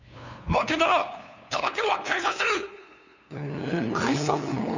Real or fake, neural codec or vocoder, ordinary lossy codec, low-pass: fake; codec, 16 kHz, 2 kbps, FunCodec, trained on LibriTTS, 25 frames a second; none; 7.2 kHz